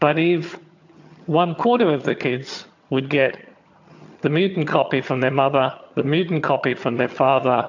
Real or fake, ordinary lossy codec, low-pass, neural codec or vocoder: fake; AAC, 48 kbps; 7.2 kHz; vocoder, 22.05 kHz, 80 mel bands, HiFi-GAN